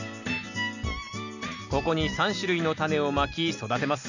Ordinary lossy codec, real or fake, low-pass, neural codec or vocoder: none; real; 7.2 kHz; none